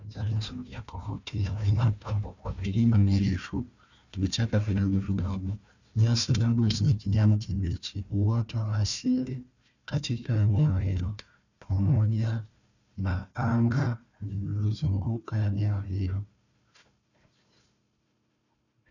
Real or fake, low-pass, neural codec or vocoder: fake; 7.2 kHz; codec, 16 kHz, 1 kbps, FunCodec, trained on Chinese and English, 50 frames a second